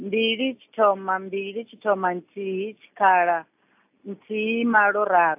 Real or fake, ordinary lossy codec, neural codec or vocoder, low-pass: real; AAC, 32 kbps; none; 3.6 kHz